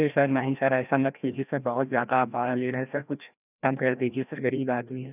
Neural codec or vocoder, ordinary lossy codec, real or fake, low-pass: codec, 16 kHz, 1 kbps, FreqCodec, larger model; none; fake; 3.6 kHz